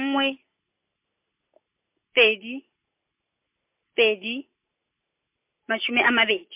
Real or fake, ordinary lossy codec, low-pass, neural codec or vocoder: real; MP3, 32 kbps; 3.6 kHz; none